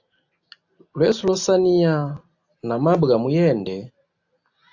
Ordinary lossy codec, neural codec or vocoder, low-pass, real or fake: AAC, 48 kbps; none; 7.2 kHz; real